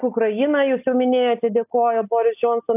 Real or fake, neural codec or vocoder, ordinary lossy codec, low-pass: real; none; AAC, 32 kbps; 3.6 kHz